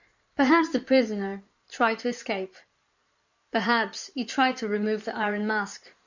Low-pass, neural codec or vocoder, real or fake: 7.2 kHz; codec, 16 kHz in and 24 kHz out, 2.2 kbps, FireRedTTS-2 codec; fake